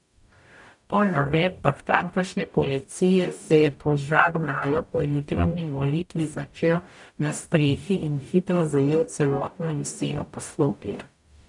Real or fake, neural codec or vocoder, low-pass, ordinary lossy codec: fake; codec, 44.1 kHz, 0.9 kbps, DAC; 10.8 kHz; none